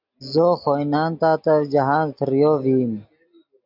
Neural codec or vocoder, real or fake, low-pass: none; real; 5.4 kHz